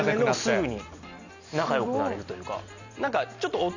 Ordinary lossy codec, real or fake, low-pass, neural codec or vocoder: none; real; 7.2 kHz; none